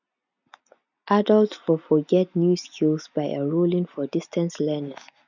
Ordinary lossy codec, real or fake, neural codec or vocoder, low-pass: none; real; none; 7.2 kHz